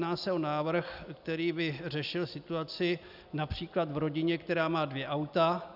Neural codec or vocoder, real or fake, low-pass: none; real; 5.4 kHz